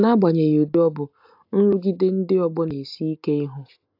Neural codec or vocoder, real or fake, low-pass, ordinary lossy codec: none; real; 5.4 kHz; AAC, 48 kbps